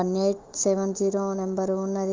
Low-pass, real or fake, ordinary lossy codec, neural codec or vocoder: 7.2 kHz; fake; Opus, 32 kbps; autoencoder, 48 kHz, 128 numbers a frame, DAC-VAE, trained on Japanese speech